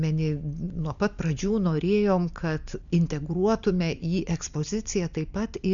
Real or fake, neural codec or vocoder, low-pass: real; none; 7.2 kHz